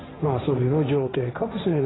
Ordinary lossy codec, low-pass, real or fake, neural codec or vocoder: AAC, 16 kbps; 7.2 kHz; fake; codec, 16 kHz, 1.1 kbps, Voila-Tokenizer